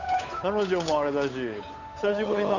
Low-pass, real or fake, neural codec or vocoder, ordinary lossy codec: 7.2 kHz; fake; codec, 16 kHz, 8 kbps, FunCodec, trained on Chinese and English, 25 frames a second; none